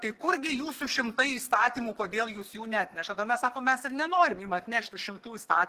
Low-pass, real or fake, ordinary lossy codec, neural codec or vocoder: 14.4 kHz; fake; Opus, 16 kbps; codec, 32 kHz, 1.9 kbps, SNAC